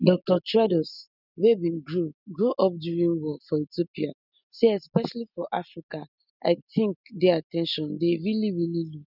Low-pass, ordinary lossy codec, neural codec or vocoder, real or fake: 5.4 kHz; none; none; real